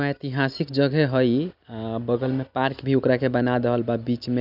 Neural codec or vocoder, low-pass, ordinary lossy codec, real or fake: none; 5.4 kHz; none; real